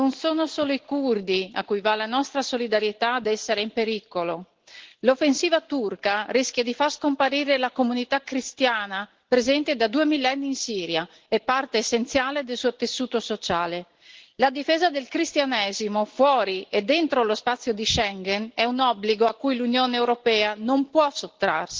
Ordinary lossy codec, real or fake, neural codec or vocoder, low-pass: Opus, 16 kbps; real; none; 7.2 kHz